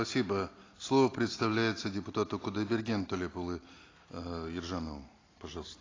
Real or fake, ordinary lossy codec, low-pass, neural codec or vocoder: real; AAC, 32 kbps; 7.2 kHz; none